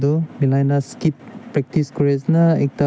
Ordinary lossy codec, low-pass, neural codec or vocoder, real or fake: none; none; none; real